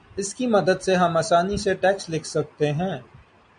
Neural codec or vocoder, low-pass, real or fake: none; 9.9 kHz; real